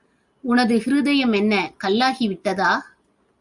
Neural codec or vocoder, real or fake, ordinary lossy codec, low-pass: none; real; Opus, 64 kbps; 10.8 kHz